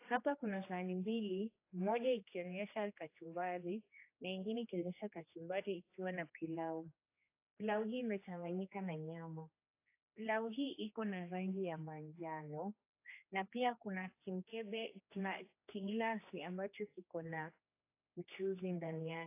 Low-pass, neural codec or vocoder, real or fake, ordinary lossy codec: 3.6 kHz; codec, 16 kHz, 2 kbps, X-Codec, HuBERT features, trained on general audio; fake; AAC, 24 kbps